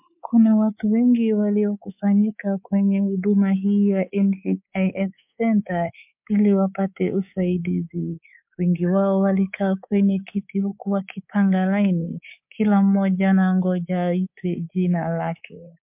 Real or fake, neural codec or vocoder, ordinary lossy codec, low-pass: fake; codec, 24 kHz, 3.1 kbps, DualCodec; MP3, 32 kbps; 3.6 kHz